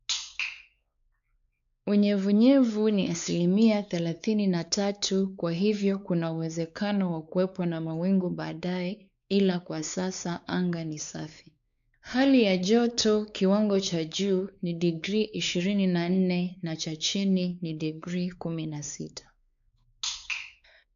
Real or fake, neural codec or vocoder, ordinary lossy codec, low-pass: fake; codec, 16 kHz, 4 kbps, X-Codec, WavLM features, trained on Multilingual LibriSpeech; none; 7.2 kHz